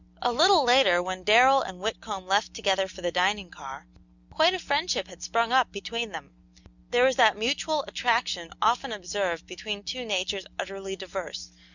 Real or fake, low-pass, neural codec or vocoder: real; 7.2 kHz; none